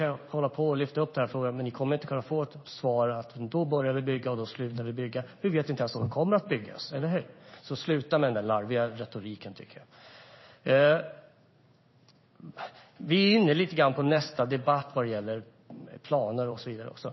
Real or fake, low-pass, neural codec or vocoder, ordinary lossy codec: fake; 7.2 kHz; codec, 16 kHz in and 24 kHz out, 1 kbps, XY-Tokenizer; MP3, 24 kbps